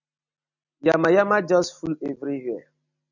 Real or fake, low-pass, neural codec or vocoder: real; 7.2 kHz; none